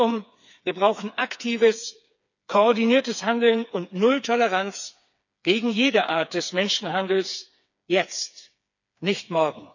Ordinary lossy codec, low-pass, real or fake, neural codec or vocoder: none; 7.2 kHz; fake; codec, 16 kHz, 4 kbps, FreqCodec, smaller model